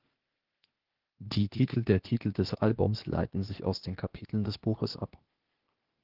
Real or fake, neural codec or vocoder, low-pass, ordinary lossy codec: fake; codec, 16 kHz, 0.8 kbps, ZipCodec; 5.4 kHz; Opus, 16 kbps